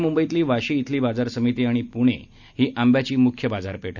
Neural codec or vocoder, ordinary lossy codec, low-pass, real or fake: none; none; 7.2 kHz; real